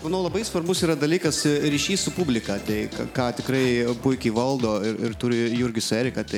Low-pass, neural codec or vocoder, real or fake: 19.8 kHz; none; real